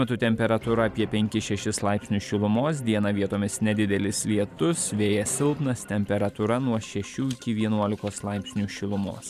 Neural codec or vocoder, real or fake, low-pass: vocoder, 44.1 kHz, 128 mel bands every 512 samples, BigVGAN v2; fake; 14.4 kHz